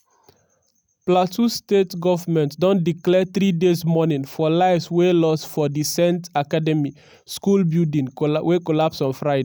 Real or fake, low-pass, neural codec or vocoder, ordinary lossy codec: real; none; none; none